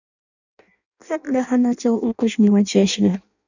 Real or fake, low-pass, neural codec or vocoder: fake; 7.2 kHz; codec, 16 kHz in and 24 kHz out, 0.6 kbps, FireRedTTS-2 codec